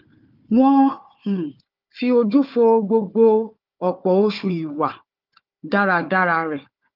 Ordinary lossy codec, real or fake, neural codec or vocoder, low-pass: Opus, 24 kbps; fake; codec, 16 kHz, 4 kbps, FunCodec, trained on Chinese and English, 50 frames a second; 5.4 kHz